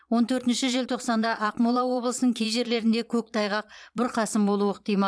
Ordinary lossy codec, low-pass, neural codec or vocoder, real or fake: none; none; vocoder, 22.05 kHz, 80 mel bands, Vocos; fake